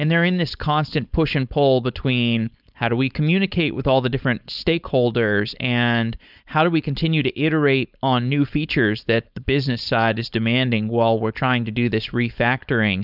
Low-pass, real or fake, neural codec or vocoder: 5.4 kHz; fake; codec, 16 kHz, 4.8 kbps, FACodec